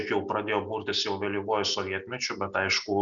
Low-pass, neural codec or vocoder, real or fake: 7.2 kHz; none; real